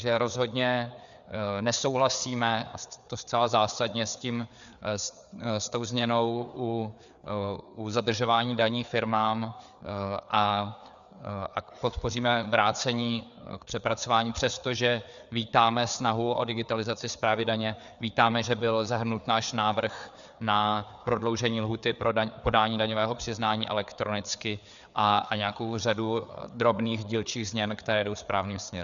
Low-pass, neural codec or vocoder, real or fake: 7.2 kHz; codec, 16 kHz, 4 kbps, FreqCodec, larger model; fake